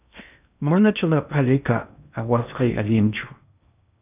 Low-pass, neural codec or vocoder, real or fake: 3.6 kHz; codec, 16 kHz in and 24 kHz out, 0.6 kbps, FocalCodec, streaming, 2048 codes; fake